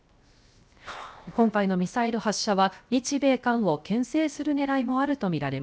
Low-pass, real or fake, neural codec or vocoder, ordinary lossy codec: none; fake; codec, 16 kHz, 0.7 kbps, FocalCodec; none